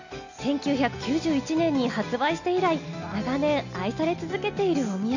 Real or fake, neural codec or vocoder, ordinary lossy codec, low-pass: real; none; none; 7.2 kHz